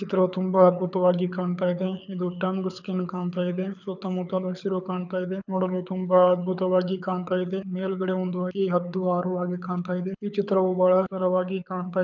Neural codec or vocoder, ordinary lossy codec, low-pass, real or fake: codec, 24 kHz, 6 kbps, HILCodec; none; 7.2 kHz; fake